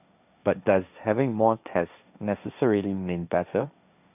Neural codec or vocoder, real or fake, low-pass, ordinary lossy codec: codec, 16 kHz, 1.1 kbps, Voila-Tokenizer; fake; 3.6 kHz; none